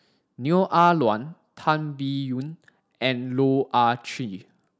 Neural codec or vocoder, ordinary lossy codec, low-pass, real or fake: none; none; none; real